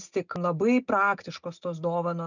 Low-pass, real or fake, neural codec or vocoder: 7.2 kHz; real; none